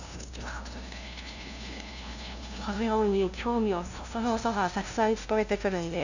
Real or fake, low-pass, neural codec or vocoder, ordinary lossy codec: fake; 7.2 kHz; codec, 16 kHz, 0.5 kbps, FunCodec, trained on LibriTTS, 25 frames a second; none